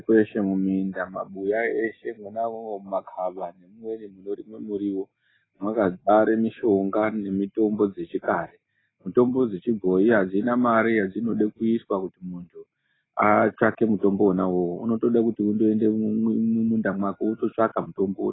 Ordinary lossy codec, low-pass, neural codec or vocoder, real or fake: AAC, 16 kbps; 7.2 kHz; none; real